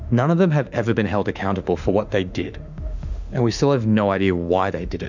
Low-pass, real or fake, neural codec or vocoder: 7.2 kHz; fake; autoencoder, 48 kHz, 32 numbers a frame, DAC-VAE, trained on Japanese speech